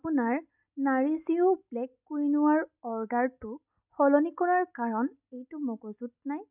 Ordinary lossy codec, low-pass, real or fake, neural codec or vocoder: none; 3.6 kHz; real; none